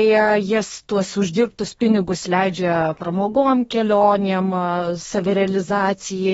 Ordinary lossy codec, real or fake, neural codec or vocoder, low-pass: AAC, 24 kbps; fake; codec, 32 kHz, 1.9 kbps, SNAC; 14.4 kHz